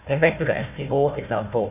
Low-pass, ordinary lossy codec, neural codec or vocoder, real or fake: 3.6 kHz; none; codec, 16 kHz, 1 kbps, FunCodec, trained on Chinese and English, 50 frames a second; fake